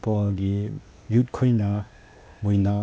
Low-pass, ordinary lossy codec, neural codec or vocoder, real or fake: none; none; codec, 16 kHz, 0.8 kbps, ZipCodec; fake